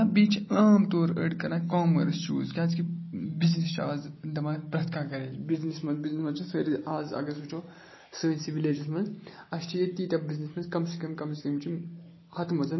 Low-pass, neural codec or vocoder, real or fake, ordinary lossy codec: 7.2 kHz; none; real; MP3, 24 kbps